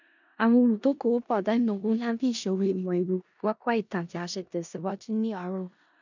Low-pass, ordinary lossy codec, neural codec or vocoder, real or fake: 7.2 kHz; none; codec, 16 kHz in and 24 kHz out, 0.4 kbps, LongCat-Audio-Codec, four codebook decoder; fake